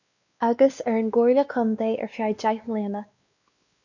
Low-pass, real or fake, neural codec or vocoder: 7.2 kHz; fake; codec, 16 kHz, 2 kbps, X-Codec, WavLM features, trained on Multilingual LibriSpeech